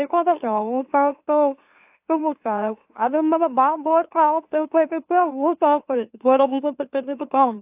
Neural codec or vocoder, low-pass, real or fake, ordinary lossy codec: autoencoder, 44.1 kHz, a latent of 192 numbers a frame, MeloTTS; 3.6 kHz; fake; AAC, 32 kbps